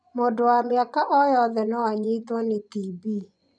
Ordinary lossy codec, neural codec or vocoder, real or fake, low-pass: none; vocoder, 24 kHz, 100 mel bands, Vocos; fake; 9.9 kHz